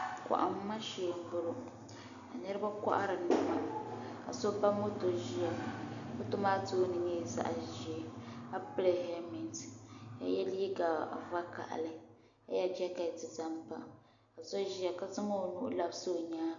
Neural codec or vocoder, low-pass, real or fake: none; 7.2 kHz; real